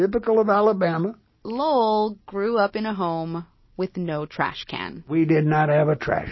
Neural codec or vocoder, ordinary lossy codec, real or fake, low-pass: none; MP3, 24 kbps; real; 7.2 kHz